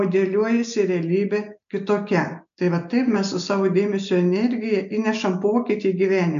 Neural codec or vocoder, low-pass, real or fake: none; 7.2 kHz; real